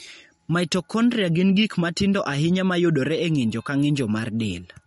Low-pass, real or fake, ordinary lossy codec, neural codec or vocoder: 19.8 kHz; real; MP3, 48 kbps; none